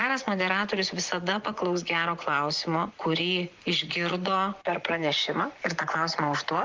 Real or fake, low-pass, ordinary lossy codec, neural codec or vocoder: fake; 7.2 kHz; Opus, 32 kbps; vocoder, 24 kHz, 100 mel bands, Vocos